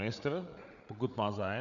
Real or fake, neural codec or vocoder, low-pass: fake; codec, 16 kHz, 8 kbps, FreqCodec, larger model; 7.2 kHz